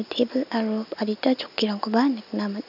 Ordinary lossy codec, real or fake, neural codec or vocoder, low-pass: none; real; none; 5.4 kHz